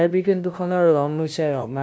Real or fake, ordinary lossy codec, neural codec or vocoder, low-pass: fake; none; codec, 16 kHz, 0.5 kbps, FunCodec, trained on LibriTTS, 25 frames a second; none